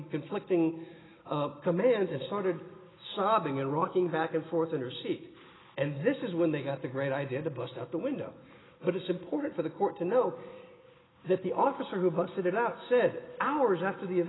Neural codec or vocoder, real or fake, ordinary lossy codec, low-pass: none; real; AAC, 16 kbps; 7.2 kHz